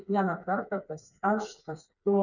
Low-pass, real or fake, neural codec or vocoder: 7.2 kHz; fake; codec, 16 kHz, 4 kbps, FreqCodec, smaller model